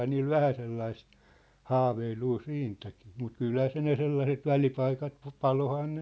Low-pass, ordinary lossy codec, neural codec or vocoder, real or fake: none; none; none; real